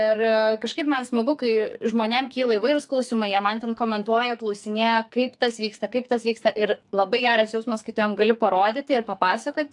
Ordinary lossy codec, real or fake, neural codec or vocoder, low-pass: AAC, 64 kbps; fake; codec, 44.1 kHz, 2.6 kbps, SNAC; 10.8 kHz